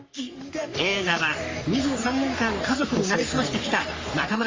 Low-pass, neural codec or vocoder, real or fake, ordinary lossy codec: 7.2 kHz; codec, 44.1 kHz, 3.4 kbps, Pupu-Codec; fake; Opus, 32 kbps